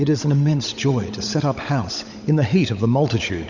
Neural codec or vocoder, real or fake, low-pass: codec, 16 kHz, 16 kbps, FunCodec, trained on LibriTTS, 50 frames a second; fake; 7.2 kHz